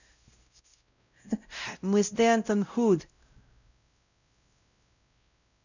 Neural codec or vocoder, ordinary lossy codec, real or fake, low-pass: codec, 16 kHz, 0.5 kbps, X-Codec, WavLM features, trained on Multilingual LibriSpeech; none; fake; 7.2 kHz